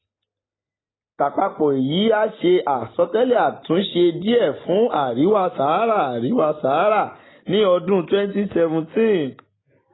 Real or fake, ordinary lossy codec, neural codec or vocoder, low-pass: real; AAC, 16 kbps; none; 7.2 kHz